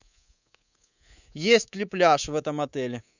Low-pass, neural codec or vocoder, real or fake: 7.2 kHz; codec, 24 kHz, 3.1 kbps, DualCodec; fake